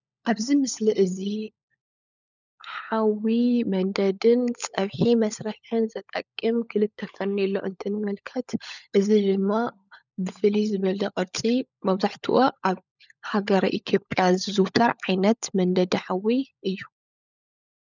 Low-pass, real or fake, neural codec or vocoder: 7.2 kHz; fake; codec, 16 kHz, 16 kbps, FunCodec, trained on LibriTTS, 50 frames a second